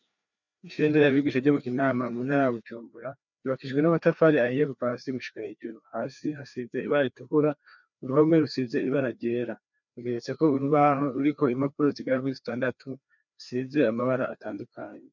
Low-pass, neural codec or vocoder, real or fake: 7.2 kHz; codec, 16 kHz, 2 kbps, FreqCodec, larger model; fake